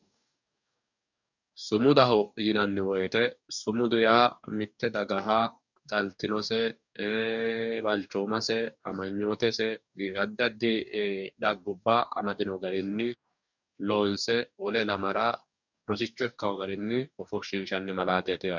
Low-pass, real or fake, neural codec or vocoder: 7.2 kHz; fake; codec, 44.1 kHz, 2.6 kbps, DAC